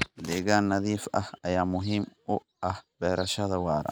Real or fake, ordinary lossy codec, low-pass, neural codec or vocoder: real; none; none; none